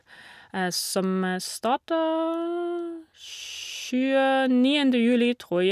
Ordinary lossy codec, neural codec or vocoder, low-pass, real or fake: none; none; 14.4 kHz; real